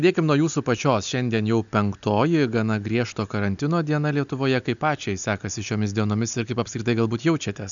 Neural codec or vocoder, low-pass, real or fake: none; 7.2 kHz; real